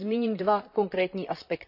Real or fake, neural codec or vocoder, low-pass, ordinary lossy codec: fake; vocoder, 44.1 kHz, 128 mel bands, Pupu-Vocoder; 5.4 kHz; none